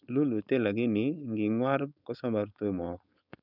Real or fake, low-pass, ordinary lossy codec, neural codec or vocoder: fake; 5.4 kHz; none; codec, 16 kHz, 4.8 kbps, FACodec